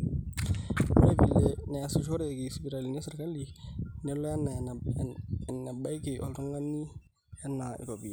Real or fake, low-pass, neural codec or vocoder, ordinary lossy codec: real; none; none; none